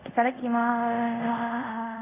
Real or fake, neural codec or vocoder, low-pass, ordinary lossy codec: fake; codec, 24 kHz, 0.5 kbps, DualCodec; 3.6 kHz; none